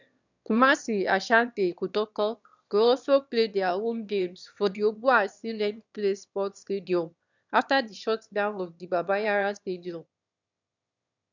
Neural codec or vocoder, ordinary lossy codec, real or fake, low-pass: autoencoder, 22.05 kHz, a latent of 192 numbers a frame, VITS, trained on one speaker; none; fake; 7.2 kHz